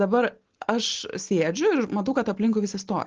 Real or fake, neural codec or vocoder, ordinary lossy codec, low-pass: real; none; Opus, 16 kbps; 7.2 kHz